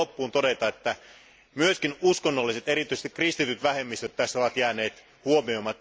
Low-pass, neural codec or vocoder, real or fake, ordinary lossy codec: none; none; real; none